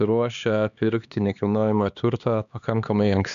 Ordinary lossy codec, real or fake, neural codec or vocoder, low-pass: AAC, 96 kbps; fake; codec, 16 kHz, 2 kbps, X-Codec, HuBERT features, trained on LibriSpeech; 7.2 kHz